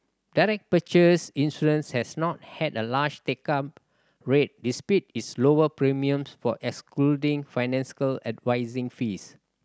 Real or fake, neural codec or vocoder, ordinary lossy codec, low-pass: real; none; none; none